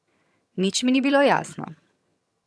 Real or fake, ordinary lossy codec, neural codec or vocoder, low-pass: fake; none; vocoder, 22.05 kHz, 80 mel bands, HiFi-GAN; none